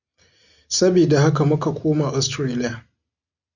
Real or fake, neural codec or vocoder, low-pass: real; none; 7.2 kHz